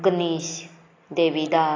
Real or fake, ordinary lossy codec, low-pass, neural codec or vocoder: real; AAC, 32 kbps; 7.2 kHz; none